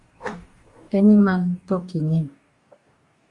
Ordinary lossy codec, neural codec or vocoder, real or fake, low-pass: Opus, 64 kbps; codec, 44.1 kHz, 2.6 kbps, DAC; fake; 10.8 kHz